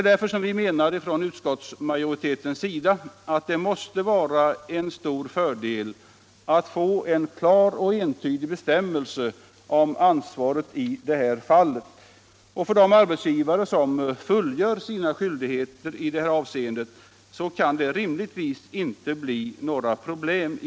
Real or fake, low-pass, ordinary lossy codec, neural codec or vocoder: real; none; none; none